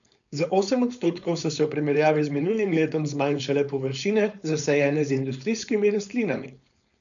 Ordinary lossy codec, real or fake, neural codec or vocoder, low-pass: none; fake; codec, 16 kHz, 4.8 kbps, FACodec; 7.2 kHz